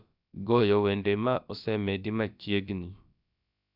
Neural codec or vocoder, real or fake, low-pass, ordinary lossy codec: codec, 16 kHz, about 1 kbps, DyCAST, with the encoder's durations; fake; 5.4 kHz; none